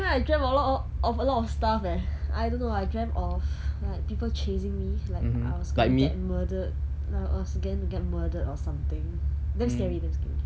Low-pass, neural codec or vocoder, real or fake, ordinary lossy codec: none; none; real; none